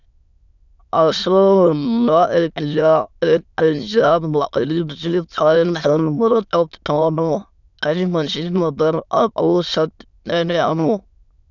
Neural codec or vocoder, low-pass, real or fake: autoencoder, 22.05 kHz, a latent of 192 numbers a frame, VITS, trained on many speakers; 7.2 kHz; fake